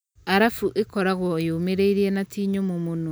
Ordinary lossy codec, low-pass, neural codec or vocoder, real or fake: none; none; none; real